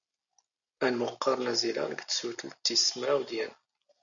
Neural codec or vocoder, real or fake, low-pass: none; real; 7.2 kHz